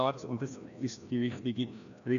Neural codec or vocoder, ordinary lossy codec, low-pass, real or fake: codec, 16 kHz, 1 kbps, FreqCodec, larger model; none; 7.2 kHz; fake